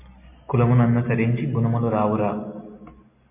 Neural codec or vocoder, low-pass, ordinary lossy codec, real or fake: none; 3.6 kHz; MP3, 24 kbps; real